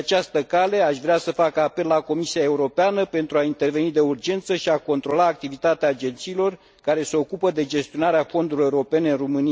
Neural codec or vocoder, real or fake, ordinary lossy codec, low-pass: none; real; none; none